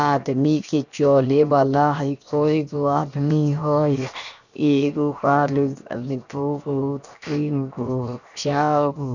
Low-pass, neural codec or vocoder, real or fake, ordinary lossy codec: 7.2 kHz; codec, 16 kHz, 0.7 kbps, FocalCodec; fake; none